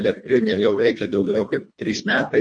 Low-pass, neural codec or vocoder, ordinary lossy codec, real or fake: 9.9 kHz; codec, 24 kHz, 1.5 kbps, HILCodec; MP3, 48 kbps; fake